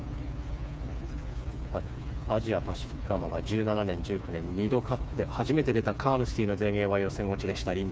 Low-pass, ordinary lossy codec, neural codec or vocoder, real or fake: none; none; codec, 16 kHz, 4 kbps, FreqCodec, smaller model; fake